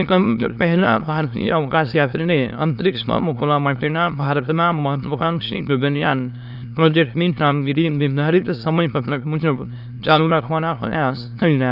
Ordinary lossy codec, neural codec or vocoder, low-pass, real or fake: none; autoencoder, 22.05 kHz, a latent of 192 numbers a frame, VITS, trained on many speakers; 5.4 kHz; fake